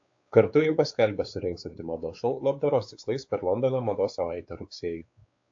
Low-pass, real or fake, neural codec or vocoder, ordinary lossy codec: 7.2 kHz; fake; codec, 16 kHz, 4 kbps, X-Codec, WavLM features, trained on Multilingual LibriSpeech; AAC, 48 kbps